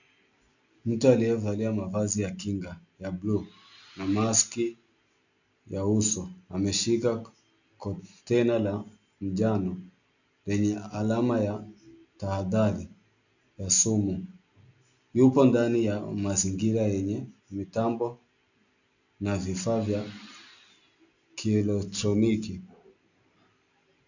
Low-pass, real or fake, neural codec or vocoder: 7.2 kHz; real; none